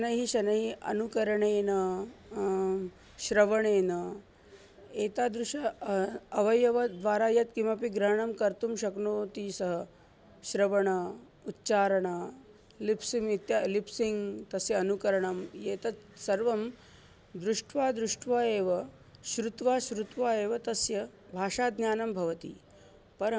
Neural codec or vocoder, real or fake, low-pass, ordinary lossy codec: none; real; none; none